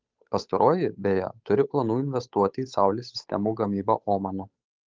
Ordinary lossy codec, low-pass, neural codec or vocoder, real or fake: Opus, 24 kbps; 7.2 kHz; codec, 16 kHz, 8 kbps, FunCodec, trained on Chinese and English, 25 frames a second; fake